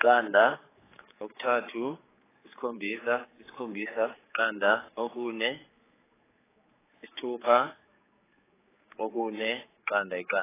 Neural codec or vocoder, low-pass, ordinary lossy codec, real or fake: codec, 16 kHz, 4 kbps, X-Codec, HuBERT features, trained on general audio; 3.6 kHz; AAC, 16 kbps; fake